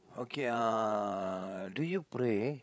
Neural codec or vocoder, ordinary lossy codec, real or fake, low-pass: codec, 16 kHz, 8 kbps, FreqCodec, larger model; none; fake; none